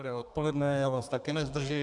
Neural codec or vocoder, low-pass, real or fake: codec, 32 kHz, 1.9 kbps, SNAC; 10.8 kHz; fake